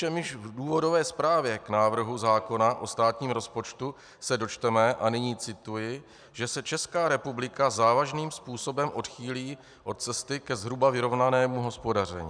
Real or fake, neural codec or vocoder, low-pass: real; none; 9.9 kHz